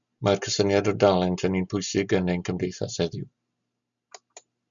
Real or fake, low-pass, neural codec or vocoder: real; 7.2 kHz; none